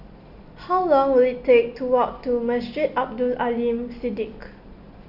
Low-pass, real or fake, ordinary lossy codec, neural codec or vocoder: 5.4 kHz; real; none; none